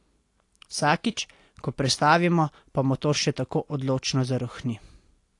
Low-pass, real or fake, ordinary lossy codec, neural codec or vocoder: 10.8 kHz; real; AAC, 48 kbps; none